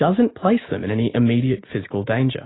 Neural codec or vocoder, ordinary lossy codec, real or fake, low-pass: none; AAC, 16 kbps; real; 7.2 kHz